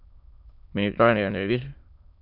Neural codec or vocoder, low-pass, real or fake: autoencoder, 22.05 kHz, a latent of 192 numbers a frame, VITS, trained on many speakers; 5.4 kHz; fake